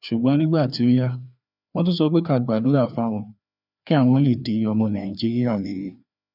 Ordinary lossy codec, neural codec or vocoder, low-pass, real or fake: none; codec, 16 kHz, 2 kbps, FreqCodec, larger model; 5.4 kHz; fake